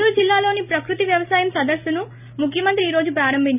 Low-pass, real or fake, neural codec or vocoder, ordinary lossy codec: 3.6 kHz; real; none; none